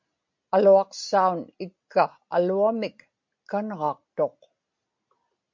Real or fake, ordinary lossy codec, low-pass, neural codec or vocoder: real; MP3, 48 kbps; 7.2 kHz; none